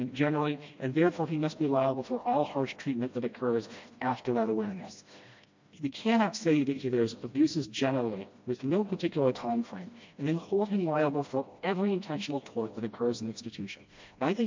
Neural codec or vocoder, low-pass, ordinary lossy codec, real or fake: codec, 16 kHz, 1 kbps, FreqCodec, smaller model; 7.2 kHz; MP3, 48 kbps; fake